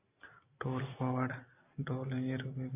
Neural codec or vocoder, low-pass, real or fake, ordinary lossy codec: none; 3.6 kHz; real; AAC, 32 kbps